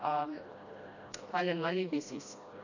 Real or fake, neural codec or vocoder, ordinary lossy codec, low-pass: fake; codec, 16 kHz, 1 kbps, FreqCodec, smaller model; none; 7.2 kHz